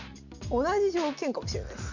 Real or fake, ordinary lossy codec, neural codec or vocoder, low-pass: real; none; none; 7.2 kHz